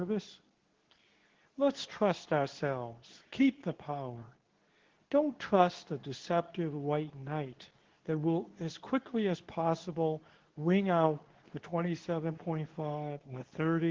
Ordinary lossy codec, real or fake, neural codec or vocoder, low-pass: Opus, 16 kbps; fake; codec, 24 kHz, 0.9 kbps, WavTokenizer, medium speech release version 2; 7.2 kHz